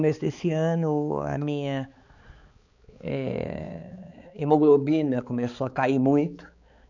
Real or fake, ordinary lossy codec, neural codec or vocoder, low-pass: fake; none; codec, 16 kHz, 4 kbps, X-Codec, HuBERT features, trained on balanced general audio; 7.2 kHz